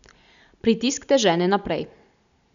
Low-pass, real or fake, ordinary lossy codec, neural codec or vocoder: 7.2 kHz; real; none; none